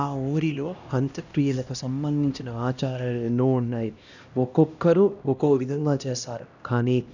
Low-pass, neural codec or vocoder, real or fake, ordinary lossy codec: 7.2 kHz; codec, 16 kHz, 1 kbps, X-Codec, HuBERT features, trained on LibriSpeech; fake; none